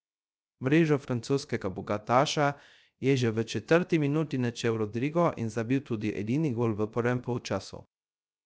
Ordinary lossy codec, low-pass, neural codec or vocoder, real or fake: none; none; codec, 16 kHz, 0.3 kbps, FocalCodec; fake